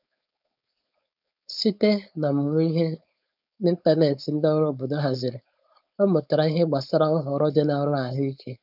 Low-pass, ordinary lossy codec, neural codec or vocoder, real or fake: 5.4 kHz; none; codec, 16 kHz, 4.8 kbps, FACodec; fake